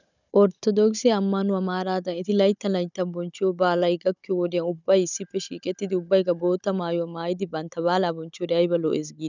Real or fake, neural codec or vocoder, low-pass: fake; codec, 16 kHz, 16 kbps, FunCodec, trained on Chinese and English, 50 frames a second; 7.2 kHz